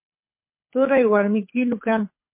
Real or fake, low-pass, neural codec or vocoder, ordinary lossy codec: fake; 3.6 kHz; codec, 24 kHz, 6 kbps, HILCodec; MP3, 24 kbps